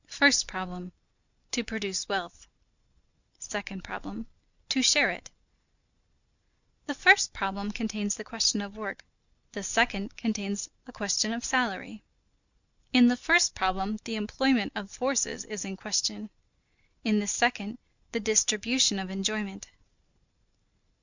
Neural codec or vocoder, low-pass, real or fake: none; 7.2 kHz; real